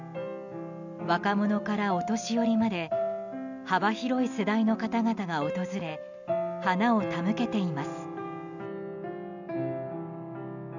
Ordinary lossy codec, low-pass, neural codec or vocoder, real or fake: none; 7.2 kHz; none; real